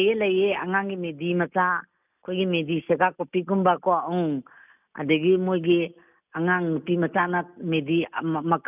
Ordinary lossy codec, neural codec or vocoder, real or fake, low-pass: none; none; real; 3.6 kHz